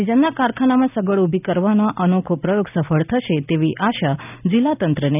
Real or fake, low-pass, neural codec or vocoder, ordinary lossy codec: real; 3.6 kHz; none; none